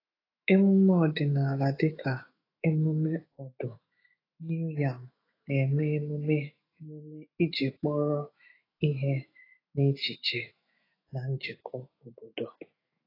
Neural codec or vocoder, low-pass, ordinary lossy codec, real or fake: autoencoder, 48 kHz, 128 numbers a frame, DAC-VAE, trained on Japanese speech; 5.4 kHz; AAC, 24 kbps; fake